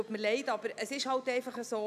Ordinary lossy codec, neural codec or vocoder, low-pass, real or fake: none; none; 14.4 kHz; real